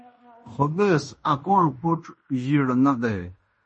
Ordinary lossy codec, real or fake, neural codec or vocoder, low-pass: MP3, 32 kbps; fake; codec, 16 kHz in and 24 kHz out, 0.9 kbps, LongCat-Audio-Codec, fine tuned four codebook decoder; 10.8 kHz